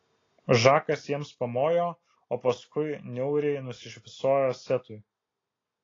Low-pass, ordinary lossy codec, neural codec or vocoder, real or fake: 7.2 kHz; AAC, 32 kbps; none; real